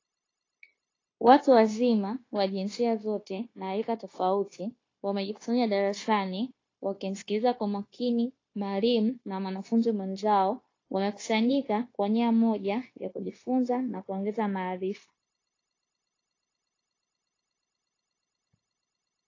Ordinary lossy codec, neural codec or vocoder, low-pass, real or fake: AAC, 32 kbps; codec, 16 kHz, 0.9 kbps, LongCat-Audio-Codec; 7.2 kHz; fake